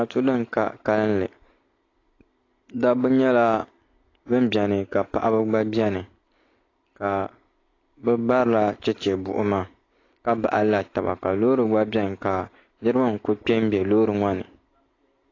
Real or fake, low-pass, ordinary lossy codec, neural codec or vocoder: real; 7.2 kHz; AAC, 32 kbps; none